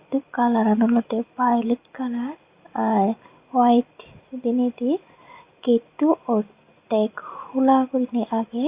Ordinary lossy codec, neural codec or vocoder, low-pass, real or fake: Opus, 64 kbps; none; 3.6 kHz; real